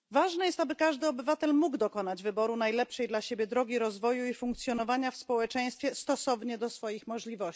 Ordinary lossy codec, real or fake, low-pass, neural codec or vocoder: none; real; none; none